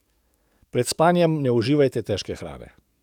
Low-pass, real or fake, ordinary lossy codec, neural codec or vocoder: 19.8 kHz; fake; none; codec, 44.1 kHz, 7.8 kbps, DAC